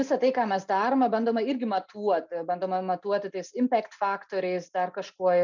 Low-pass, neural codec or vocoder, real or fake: 7.2 kHz; none; real